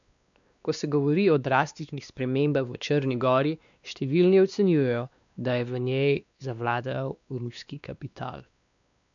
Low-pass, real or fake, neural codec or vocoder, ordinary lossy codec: 7.2 kHz; fake; codec, 16 kHz, 2 kbps, X-Codec, WavLM features, trained on Multilingual LibriSpeech; MP3, 96 kbps